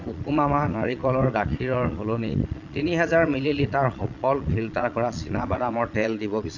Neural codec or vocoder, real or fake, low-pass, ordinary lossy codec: vocoder, 22.05 kHz, 80 mel bands, Vocos; fake; 7.2 kHz; none